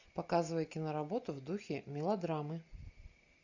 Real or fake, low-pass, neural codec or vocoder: real; 7.2 kHz; none